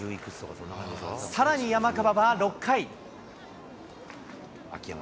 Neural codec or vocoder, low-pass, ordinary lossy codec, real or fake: none; none; none; real